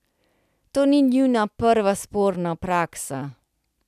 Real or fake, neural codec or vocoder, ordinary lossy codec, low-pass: real; none; none; 14.4 kHz